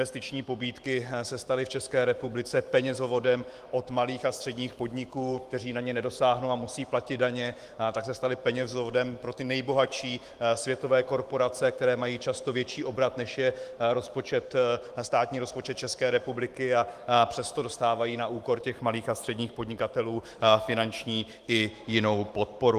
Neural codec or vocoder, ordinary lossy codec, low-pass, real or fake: autoencoder, 48 kHz, 128 numbers a frame, DAC-VAE, trained on Japanese speech; Opus, 24 kbps; 14.4 kHz; fake